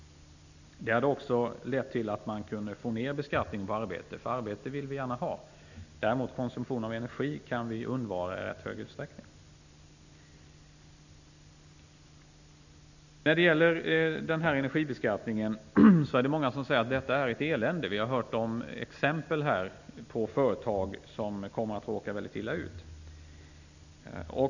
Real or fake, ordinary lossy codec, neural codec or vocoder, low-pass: real; none; none; 7.2 kHz